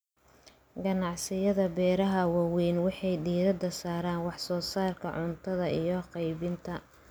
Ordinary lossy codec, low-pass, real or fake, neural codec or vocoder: none; none; real; none